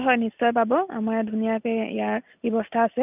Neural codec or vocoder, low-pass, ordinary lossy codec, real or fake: none; 3.6 kHz; none; real